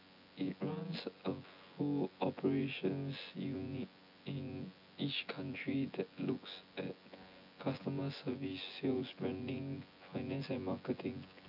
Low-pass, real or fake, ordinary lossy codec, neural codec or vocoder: 5.4 kHz; fake; AAC, 48 kbps; vocoder, 24 kHz, 100 mel bands, Vocos